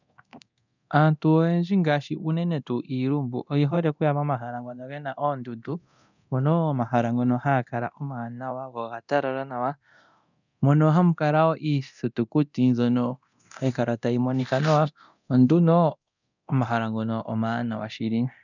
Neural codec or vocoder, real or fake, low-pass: codec, 24 kHz, 0.9 kbps, DualCodec; fake; 7.2 kHz